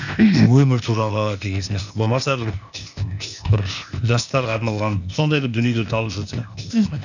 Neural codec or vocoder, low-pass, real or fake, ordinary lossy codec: codec, 16 kHz, 0.8 kbps, ZipCodec; 7.2 kHz; fake; none